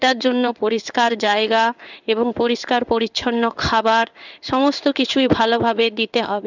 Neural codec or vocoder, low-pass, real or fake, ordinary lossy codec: codec, 16 kHz in and 24 kHz out, 1 kbps, XY-Tokenizer; 7.2 kHz; fake; none